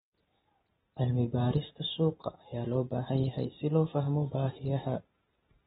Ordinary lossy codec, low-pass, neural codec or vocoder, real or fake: AAC, 16 kbps; 19.8 kHz; none; real